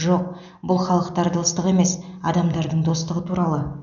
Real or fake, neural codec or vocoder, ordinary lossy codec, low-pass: real; none; none; 7.2 kHz